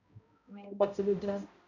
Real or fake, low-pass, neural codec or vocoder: fake; 7.2 kHz; codec, 16 kHz, 0.5 kbps, X-Codec, HuBERT features, trained on balanced general audio